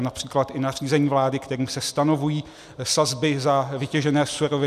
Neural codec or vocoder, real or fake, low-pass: none; real; 14.4 kHz